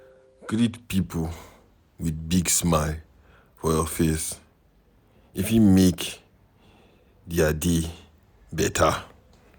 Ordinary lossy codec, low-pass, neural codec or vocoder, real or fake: none; none; none; real